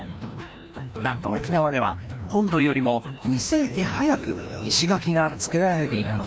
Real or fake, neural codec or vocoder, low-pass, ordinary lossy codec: fake; codec, 16 kHz, 1 kbps, FreqCodec, larger model; none; none